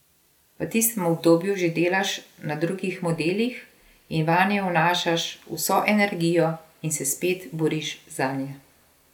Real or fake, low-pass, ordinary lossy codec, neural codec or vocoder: real; 19.8 kHz; none; none